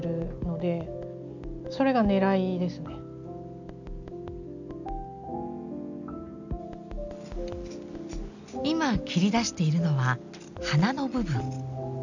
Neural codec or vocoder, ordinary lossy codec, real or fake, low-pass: none; none; real; 7.2 kHz